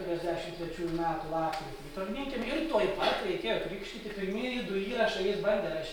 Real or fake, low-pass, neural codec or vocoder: real; 19.8 kHz; none